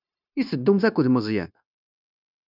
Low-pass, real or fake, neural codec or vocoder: 5.4 kHz; fake; codec, 16 kHz, 0.9 kbps, LongCat-Audio-Codec